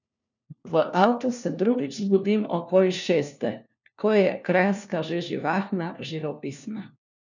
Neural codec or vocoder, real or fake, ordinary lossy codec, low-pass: codec, 16 kHz, 1 kbps, FunCodec, trained on LibriTTS, 50 frames a second; fake; none; 7.2 kHz